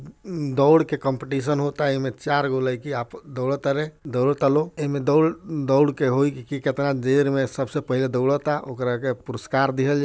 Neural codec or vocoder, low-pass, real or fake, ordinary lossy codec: none; none; real; none